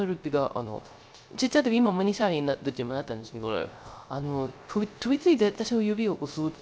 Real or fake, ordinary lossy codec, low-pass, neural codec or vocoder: fake; none; none; codec, 16 kHz, 0.3 kbps, FocalCodec